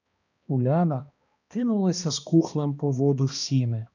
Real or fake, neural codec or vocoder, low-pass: fake; codec, 16 kHz, 1 kbps, X-Codec, HuBERT features, trained on balanced general audio; 7.2 kHz